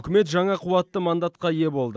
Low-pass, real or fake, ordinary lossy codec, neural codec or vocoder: none; real; none; none